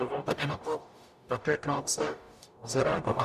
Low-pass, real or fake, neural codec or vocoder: 14.4 kHz; fake; codec, 44.1 kHz, 0.9 kbps, DAC